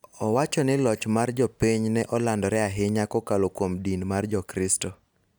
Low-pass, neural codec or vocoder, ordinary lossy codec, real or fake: none; none; none; real